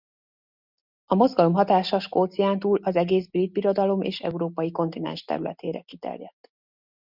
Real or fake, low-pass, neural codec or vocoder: real; 5.4 kHz; none